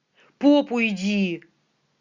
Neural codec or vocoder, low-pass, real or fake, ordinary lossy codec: none; 7.2 kHz; real; Opus, 64 kbps